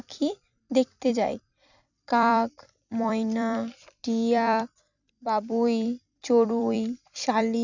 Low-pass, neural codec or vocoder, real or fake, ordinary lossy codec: 7.2 kHz; vocoder, 44.1 kHz, 128 mel bands every 256 samples, BigVGAN v2; fake; none